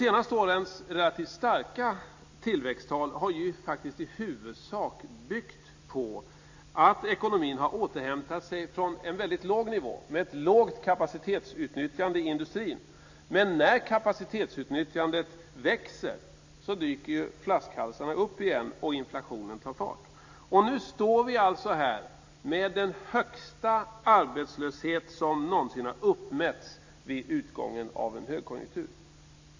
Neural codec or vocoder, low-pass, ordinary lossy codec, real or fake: none; 7.2 kHz; AAC, 48 kbps; real